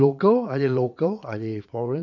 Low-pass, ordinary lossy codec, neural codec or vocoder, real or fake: 7.2 kHz; AAC, 48 kbps; vocoder, 44.1 kHz, 80 mel bands, Vocos; fake